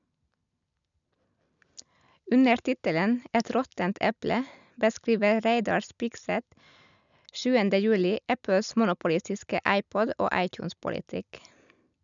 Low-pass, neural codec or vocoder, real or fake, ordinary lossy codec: 7.2 kHz; none; real; none